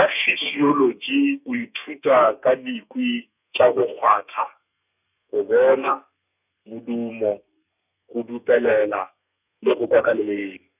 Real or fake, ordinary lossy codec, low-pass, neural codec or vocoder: fake; none; 3.6 kHz; codec, 32 kHz, 1.9 kbps, SNAC